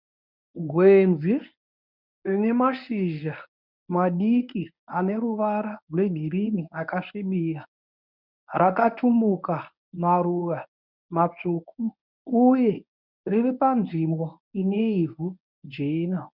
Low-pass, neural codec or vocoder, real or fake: 5.4 kHz; codec, 24 kHz, 0.9 kbps, WavTokenizer, medium speech release version 1; fake